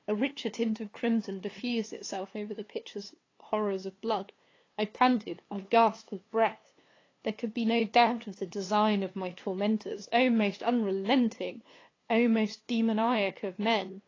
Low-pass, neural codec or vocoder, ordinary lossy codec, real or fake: 7.2 kHz; codec, 16 kHz, 2 kbps, FunCodec, trained on LibriTTS, 25 frames a second; AAC, 32 kbps; fake